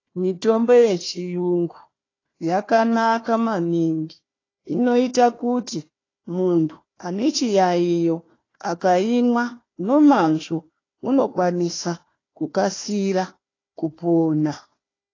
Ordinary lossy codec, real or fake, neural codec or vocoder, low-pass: AAC, 32 kbps; fake; codec, 16 kHz, 1 kbps, FunCodec, trained on Chinese and English, 50 frames a second; 7.2 kHz